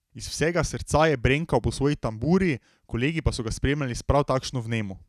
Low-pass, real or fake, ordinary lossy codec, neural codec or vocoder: 14.4 kHz; real; none; none